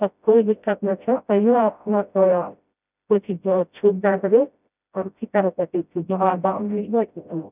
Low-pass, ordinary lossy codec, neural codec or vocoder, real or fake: 3.6 kHz; none; codec, 16 kHz, 0.5 kbps, FreqCodec, smaller model; fake